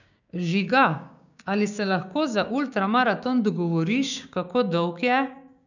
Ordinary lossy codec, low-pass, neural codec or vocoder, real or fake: none; 7.2 kHz; codec, 16 kHz, 6 kbps, DAC; fake